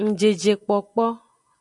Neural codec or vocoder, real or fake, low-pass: none; real; 10.8 kHz